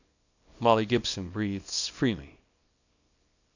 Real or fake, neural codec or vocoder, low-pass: fake; codec, 24 kHz, 0.9 kbps, WavTokenizer, small release; 7.2 kHz